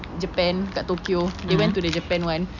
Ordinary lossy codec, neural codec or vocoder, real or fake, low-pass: none; none; real; 7.2 kHz